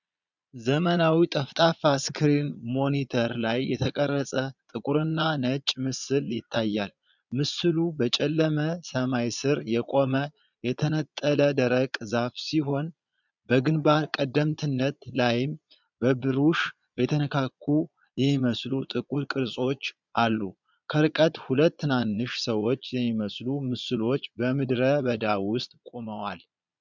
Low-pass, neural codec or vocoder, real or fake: 7.2 kHz; vocoder, 22.05 kHz, 80 mel bands, Vocos; fake